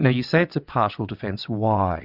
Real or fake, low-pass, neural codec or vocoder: fake; 5.4 kHz; vocoder, 22.05 kHz, 80 mel bands, WaveNeXt